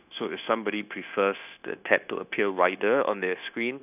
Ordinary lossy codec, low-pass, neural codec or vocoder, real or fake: none; 3.6 kHz; codec, 16 kHz, 0.9 kbps, LongCat-Audio-Codec; fake